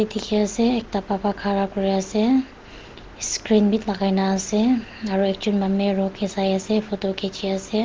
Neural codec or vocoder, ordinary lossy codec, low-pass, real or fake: none; Opus, 16 kbps; 7.2 kHz; real